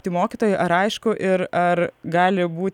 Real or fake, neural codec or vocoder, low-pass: real; none; 19.8 kHz